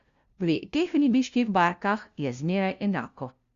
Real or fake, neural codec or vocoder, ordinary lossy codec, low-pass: fake; codec, 16 kHz, 0.5 kbps, FunCodec, trained on LibriTTS, 25 frames a second; Opus, 64 kbps; 7.2 kHz